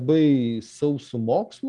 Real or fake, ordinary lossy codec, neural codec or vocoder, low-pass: real; Opus, 32 kbps; none; 10.8 kHz